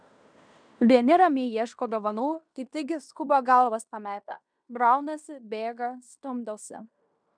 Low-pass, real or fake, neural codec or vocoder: 9.9 kHz; fake; codec, 16 kHz in and 24 kHz out, 0.9 kbps, LongCat-Audio-Codec, fine tuned four codebook decoder